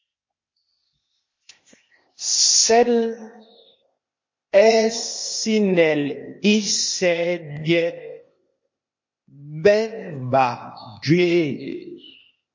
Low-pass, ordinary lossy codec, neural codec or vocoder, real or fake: 7.2 kHz; MP3, 32 kbps; codec, 16 kHz, 0.8 kbps, ZipCodec; fake